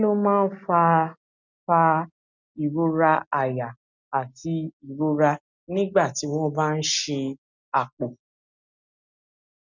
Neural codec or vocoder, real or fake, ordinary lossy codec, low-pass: none; real; none; 7.2 kHz